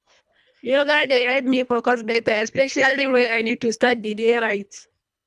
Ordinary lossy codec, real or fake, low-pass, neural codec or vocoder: none; fake; none; codec, 24 kHz, 1.5 kbps, HILCodec